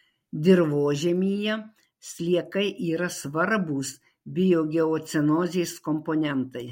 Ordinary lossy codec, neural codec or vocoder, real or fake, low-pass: MP3, 64 kbps; none; real; 19.8 kHz